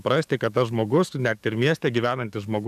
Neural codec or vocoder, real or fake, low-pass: codec, 44.1 kHz, 7.8 kbps, DAC; fake; 14.4 kHz